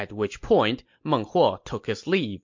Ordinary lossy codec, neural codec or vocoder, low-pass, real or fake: MP3, 48 kbps; none; 7.2 kHz; real